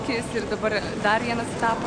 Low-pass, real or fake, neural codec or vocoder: 9.9 kHz; real; none